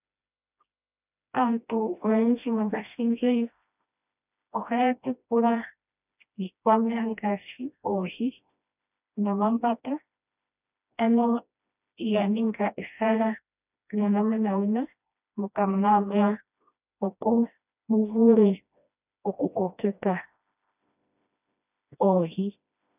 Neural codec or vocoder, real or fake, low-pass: codec, 16 kHz, 1 kbps, FreqCodec, smaller model; fake; 3.6 kHz